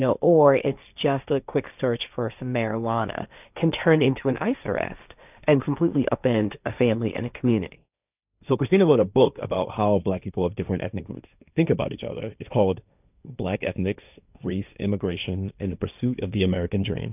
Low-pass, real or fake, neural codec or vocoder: 3.6 kHz; fake; codec, 16 kHz, 1.1 kbps, Voila-Tokenizer